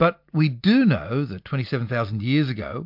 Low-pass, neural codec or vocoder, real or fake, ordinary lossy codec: 5.4 kHz; none; real; MP3, 48 kbps